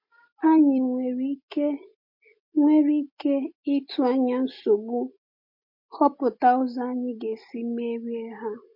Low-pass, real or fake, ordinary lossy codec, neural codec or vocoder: 5.4 kHz; real; MP3, 32 kbps; none